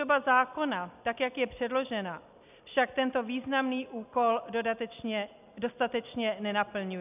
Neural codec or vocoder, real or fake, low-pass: none; real; 3.6 kHz